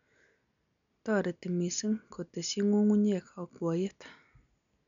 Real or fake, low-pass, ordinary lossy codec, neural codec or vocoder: real; 7.2 kHz; none; none